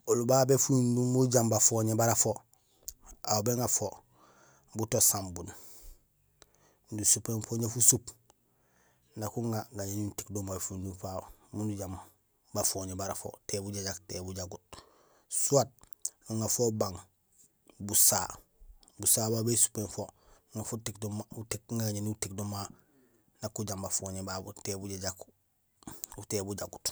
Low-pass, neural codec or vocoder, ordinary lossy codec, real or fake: none; none; none; real